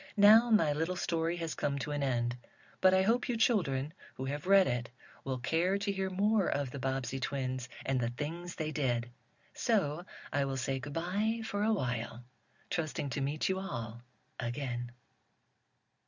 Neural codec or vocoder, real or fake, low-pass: none; real; 7.2 kHz